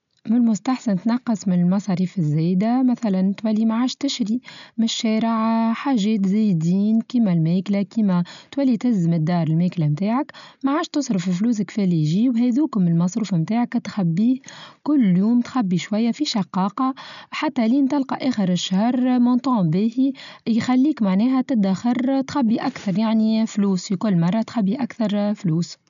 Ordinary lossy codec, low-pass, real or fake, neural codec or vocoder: MP3, 96 kbps; 7.2 kHz; real; none